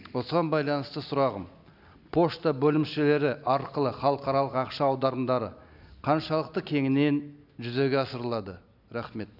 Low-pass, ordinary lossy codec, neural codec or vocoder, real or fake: 5.4 kHz; none; none; real